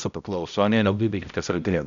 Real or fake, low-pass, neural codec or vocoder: fake; 7.2 kHz; codec, 16 kHz, 0.5 kbps, X-Codec, HuBERT features, trained on balanced general audio